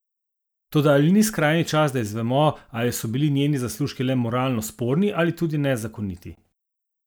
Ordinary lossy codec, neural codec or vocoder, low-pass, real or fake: none; none; none; real